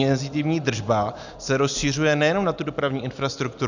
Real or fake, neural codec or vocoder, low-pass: real; none; 7.2 kHz